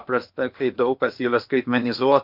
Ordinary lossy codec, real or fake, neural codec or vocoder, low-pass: MP3, 48 kbps; fake; codec, 16 kHz in and 24 kHz out, 0.8 kbps, FocalCodec, streaming, 65536 codes; 5.4 kHz